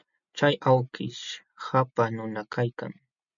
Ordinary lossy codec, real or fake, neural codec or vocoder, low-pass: MP3, 64 kbps; real; none; 7.2 kHz